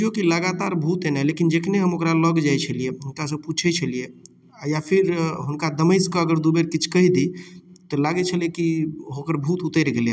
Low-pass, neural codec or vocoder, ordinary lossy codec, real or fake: none; none; none; real